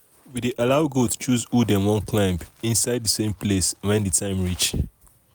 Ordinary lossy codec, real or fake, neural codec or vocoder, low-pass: none; real; none; none